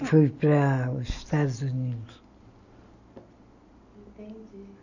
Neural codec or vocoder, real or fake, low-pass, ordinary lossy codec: none; real; 7.2 kHz; none